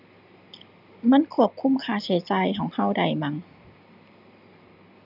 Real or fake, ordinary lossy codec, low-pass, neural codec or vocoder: real; none; 5.4 kHz; none